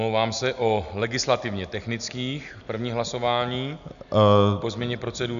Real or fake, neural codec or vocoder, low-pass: real; none; 7.2 kHz